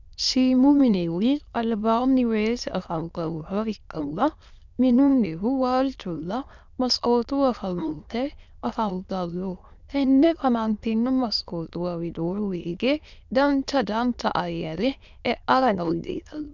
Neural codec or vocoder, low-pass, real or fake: autoencoder, 22.05 kHz, a latent of 192 numbers a frame, VITS, trained on many speakers; 7.2 kHz; fake